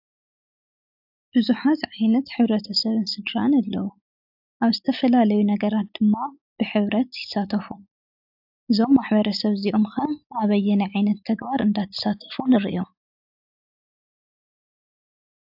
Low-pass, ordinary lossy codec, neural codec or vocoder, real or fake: 5.4 kHz; AAC, 48 kbps; none; real